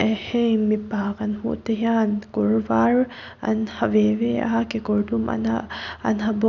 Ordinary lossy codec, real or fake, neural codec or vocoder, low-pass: none; real; none; 7.2 kHz